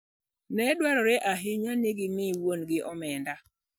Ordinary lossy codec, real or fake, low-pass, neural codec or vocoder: none; real; none; none